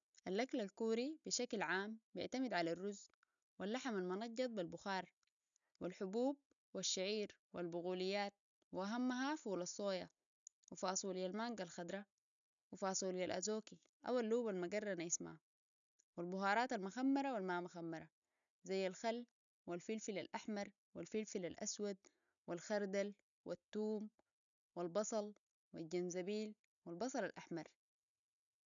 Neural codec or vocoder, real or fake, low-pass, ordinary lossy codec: none; real; 7.2 kHz; none